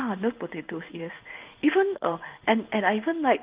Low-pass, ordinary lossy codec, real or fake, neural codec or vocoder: 3.6 kHz; Opus, 24 kbps; fake; codec, 16 kHz in and 24 kHz out, 1 kbps, XY-Tokenizer